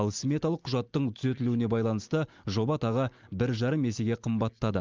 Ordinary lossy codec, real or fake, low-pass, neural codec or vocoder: Opus, 32 kbps; real; 7.2 kHz; none